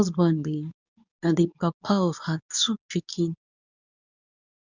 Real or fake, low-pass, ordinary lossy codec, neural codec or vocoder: fake; 7.2 kHz; none; codec, 24 kHz, 0.9 kbps, WavTokenizer, medium speech release version 2